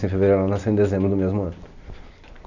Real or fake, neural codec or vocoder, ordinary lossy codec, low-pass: real; none; none; 7.2 kHz